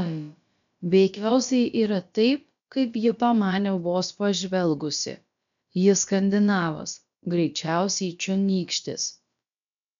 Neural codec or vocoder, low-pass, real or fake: codec, 16 kHz, about 1 kbps, DyCAST, with the encoder's durations; 7.2 kHz; fake